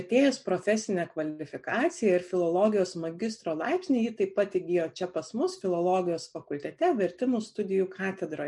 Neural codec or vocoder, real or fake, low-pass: none; real; 10.8 kHz